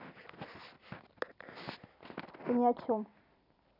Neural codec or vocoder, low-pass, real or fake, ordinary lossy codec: none; 5.4 kHz; real; none